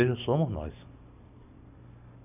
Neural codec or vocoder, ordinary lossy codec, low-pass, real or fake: none; none; 3.6 kHz; real